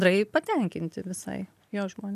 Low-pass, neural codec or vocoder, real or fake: 14.4 kHz; none; real